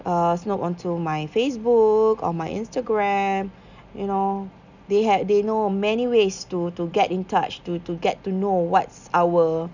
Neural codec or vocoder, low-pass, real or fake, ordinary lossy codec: none; 7.2 kHz; real; none